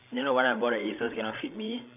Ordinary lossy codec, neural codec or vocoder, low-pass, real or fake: none; codec, 16 kHz, 16 kbps, FreqCodec, larger model; 3.6 kHz; fake